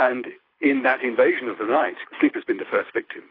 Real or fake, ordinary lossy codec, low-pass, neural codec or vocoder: fake; AAC, 24 kbps; 5.4 kHz; codec, 24 kHz, 6 kbps, HILCodec